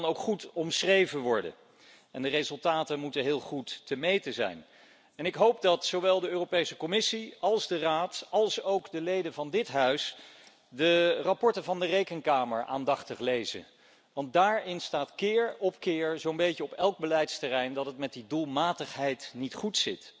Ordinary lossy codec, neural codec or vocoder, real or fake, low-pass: none; none; real; none